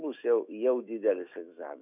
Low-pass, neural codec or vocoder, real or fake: 3.6 kHz; autoencoder, 48 kHz, 128 numbers a frame, DAC-VAE, trained on Japanese speech; fake